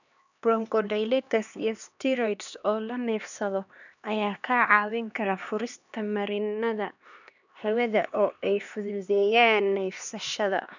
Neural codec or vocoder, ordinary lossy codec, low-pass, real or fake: codec, 16 kHz, 2 kbps, X-Codec, HuBERT features, trained on LibriSpeech; none; 7.2 kHz; fake